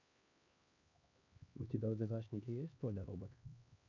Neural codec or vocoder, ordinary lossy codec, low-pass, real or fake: codec, 16 kHz, 2 kbps, X-Codec, HuBERT features, trained on LibriSpeech; MP3, 48 kbps; 7.2 kHz; fake